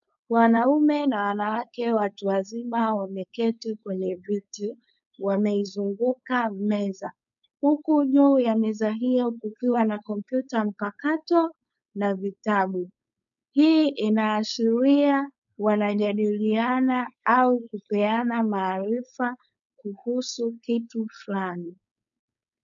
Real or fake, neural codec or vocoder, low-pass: fake; codec, 16 kHz, 4.8 kbps, FACodec; 7.2 kHz